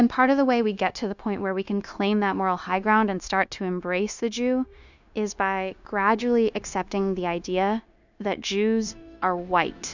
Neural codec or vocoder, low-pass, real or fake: codec, 16 kHz, 0.9 kbps, LongCat-Audio-Codec; 7.2 kHz; fake